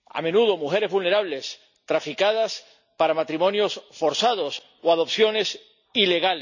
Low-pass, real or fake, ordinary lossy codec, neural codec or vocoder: 7.2 kHz; real; none; none